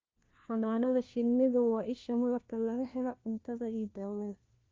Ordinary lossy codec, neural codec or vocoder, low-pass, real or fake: Opus, 24 kbps; codec, 16 kHz, 1 kbps, FunCodec, trained on LibriTTS, 50 frames a second; 7.2 kHz; fake